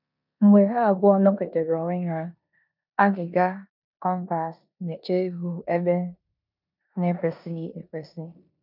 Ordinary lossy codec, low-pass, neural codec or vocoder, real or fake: none; 5.4 kHz; codec, 16 kHz in and 24 kHz out, 0.9 kbps, LongCat-Audio-Codec, four codebook decoder; fake